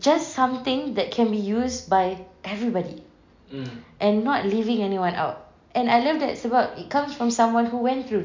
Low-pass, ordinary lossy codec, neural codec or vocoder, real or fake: 7.2 kHz; MP3, 64 kbps; none; real